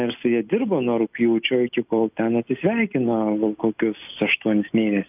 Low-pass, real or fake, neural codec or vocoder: 3.6 kHz; real; none